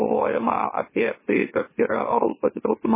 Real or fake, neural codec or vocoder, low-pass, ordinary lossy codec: fake; autoencoder, 44.1 kHz, a latent of 192 numbers a frame, MeloTTS; 3.6 kHz; MP3, 16 kbps